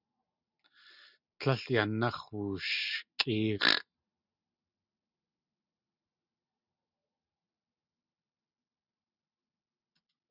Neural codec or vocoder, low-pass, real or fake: none; 5.4 kHz; real